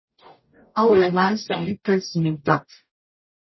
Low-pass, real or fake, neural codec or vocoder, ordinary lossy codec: 7.2 kHz; fake; codec, 44.1 kHz, 0.9 kbps, DAC; MP3, 24 kbps